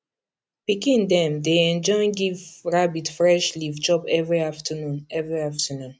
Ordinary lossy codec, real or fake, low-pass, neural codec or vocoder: none; real; none; none